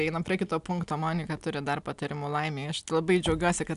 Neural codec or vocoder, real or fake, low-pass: none; real; 10.8 kHz